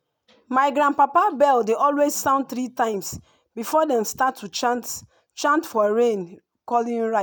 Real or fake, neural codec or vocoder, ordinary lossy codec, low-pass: real; none; none; none